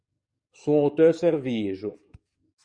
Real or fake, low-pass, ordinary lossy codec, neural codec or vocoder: fake; 9.9 kHz; Opus, 64 kbps; codec, 44.1 kHz, 7.8 kbps, DAC